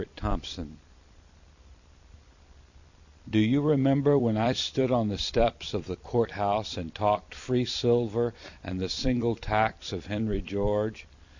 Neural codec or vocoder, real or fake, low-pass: vocoder, 44.1 kHz, 128 mel bands every 256 samples, BigVGAN v2; fake; 7.2 kHz